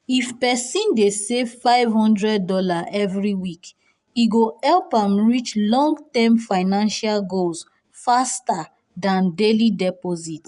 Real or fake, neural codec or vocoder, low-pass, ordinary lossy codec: fake; vocoder, 24 kHz, 100 mel bands, Vocos; 10.8 kHz; none